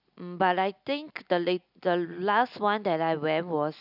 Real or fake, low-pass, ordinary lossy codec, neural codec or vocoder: real; 5.4 kHz; none; none